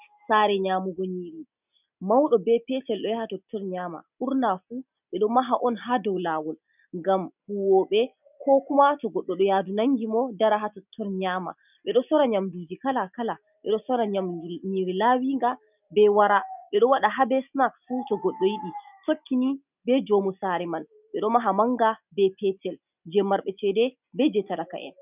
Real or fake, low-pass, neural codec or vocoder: real; 3.6 kHz; none